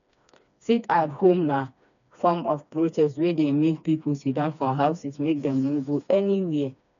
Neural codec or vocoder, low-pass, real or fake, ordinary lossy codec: codec, 16 kHz, 2 kbps, FreqCodec, smaller model; 7.2 kHz; fake; none